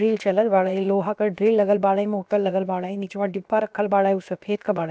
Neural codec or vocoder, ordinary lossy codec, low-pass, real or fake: codec, 16 kHz, about 1 kbps, DyCAST, with the encoder's durations; none; none; fake